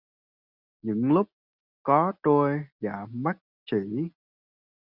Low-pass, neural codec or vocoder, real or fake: 5.4 kHz; none; real